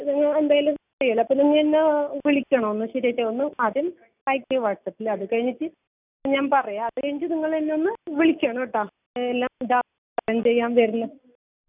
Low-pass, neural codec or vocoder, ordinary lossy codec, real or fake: 3.6 kHz; none; none; real